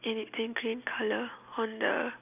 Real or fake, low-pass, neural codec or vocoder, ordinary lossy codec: real; 3.6 kHz; none; none